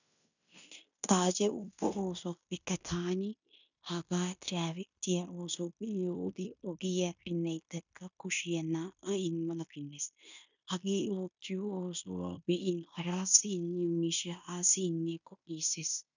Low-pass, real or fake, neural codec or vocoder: 7.2 kHz; fake; codec, 16 kHz in and 24 kHz out, 0.9 kbps, LongCat-Audio-Codec, fine tuned four codebook decoder